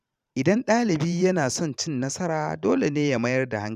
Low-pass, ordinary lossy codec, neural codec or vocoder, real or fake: 14.4 kHz; none; vocoder, 44.1 kHz, 128 mel bands every 512 samples, BigVGAN v2; fake